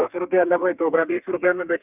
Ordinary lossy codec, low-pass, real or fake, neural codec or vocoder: none; 3.6 kHz; fake; codec, 32 kHz, 1.9 kbps, SNAC